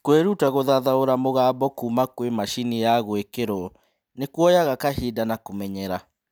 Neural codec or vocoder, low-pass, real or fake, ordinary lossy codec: none; none; real; none